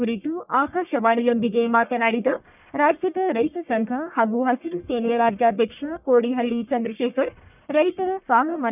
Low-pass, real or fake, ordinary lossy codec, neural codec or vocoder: 3.6 kHz; fake; none; codec, 44.1 kHz, 1.7 kbps, Pupu-Codec